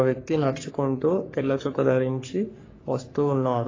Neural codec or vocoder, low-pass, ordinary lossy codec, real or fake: codec, 44.1 kHz, 3.4 kbps, Pupu-Codec; 7.2 kHz; AAC, 32 kbps; fake